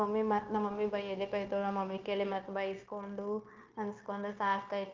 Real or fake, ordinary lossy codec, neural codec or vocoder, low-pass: fake; Opus, 32 kbps; codec, 16 kHz, 0.9 kbps, LongCat-Audio-Codec; 7.2 kHz